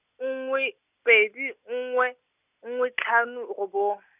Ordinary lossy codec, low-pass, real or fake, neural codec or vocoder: none; 3.6 kHz; real; none